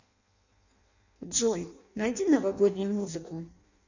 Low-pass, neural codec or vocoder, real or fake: 7.2 kHz; codec, 16 kHz in and 24 kHz out, 0.6 kbps, FireRedTTS-2 codec; fake